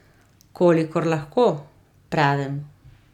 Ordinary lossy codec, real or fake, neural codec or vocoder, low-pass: none; real; none; 19.8 kHz